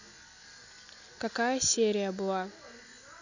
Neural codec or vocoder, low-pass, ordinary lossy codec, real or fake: none; 7.2 kHz; none; real